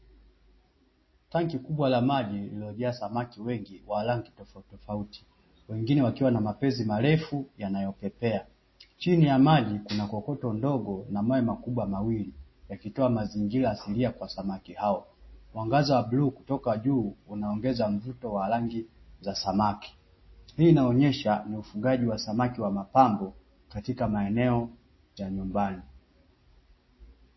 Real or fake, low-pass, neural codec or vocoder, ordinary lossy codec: real; 7.2 kHz; none; MP3, 24 kbps